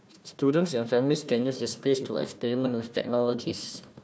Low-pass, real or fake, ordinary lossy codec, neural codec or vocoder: none; fake; none; codec, 16 kHz, 1 kbps, FunCodec, trained on Chinese and English, 50 frames a second